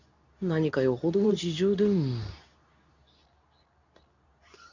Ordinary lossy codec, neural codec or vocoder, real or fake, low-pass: none; codec, 24 kHz, 0.9 kbps, WavTokenizer, medium speech release version 2; fake; 7.2 kHz